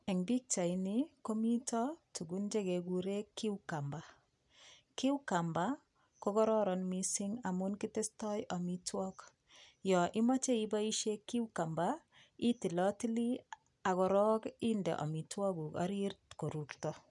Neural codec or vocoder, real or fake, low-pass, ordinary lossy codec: none; real; 10.8 kHz; none